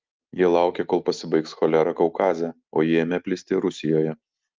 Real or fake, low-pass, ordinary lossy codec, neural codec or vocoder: real; 7.2 kHz; Opus, 32 kbps; none